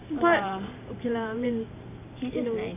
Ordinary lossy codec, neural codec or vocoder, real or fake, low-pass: AAC, 24 kbps; vocoder, 44.1 kHz, 128 mel bands every 512 samples, BigVGAN v2; fake; 3.6 kHz